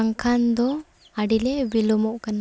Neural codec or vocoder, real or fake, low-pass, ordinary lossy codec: none; real; none; none